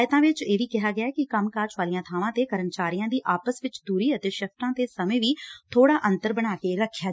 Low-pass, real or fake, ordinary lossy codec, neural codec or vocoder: none; real; none; none